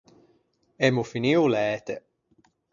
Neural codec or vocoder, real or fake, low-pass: none; real; 7.2 kHz